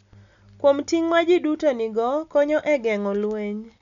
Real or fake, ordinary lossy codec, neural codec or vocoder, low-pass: real; none; none; 7.2 kHz